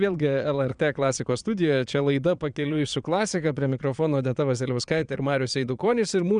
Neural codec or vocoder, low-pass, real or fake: vocoder, 22.05 kHz, 80 mel bands, Vocos; 9.9 kHz; fake